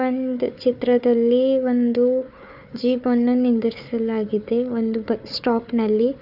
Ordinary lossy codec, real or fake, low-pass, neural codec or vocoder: none; fake; 5.4 kHz; codec, 16 kHz, 4 kbps, FunCodec, trained on Chinese and English, 50 frames a second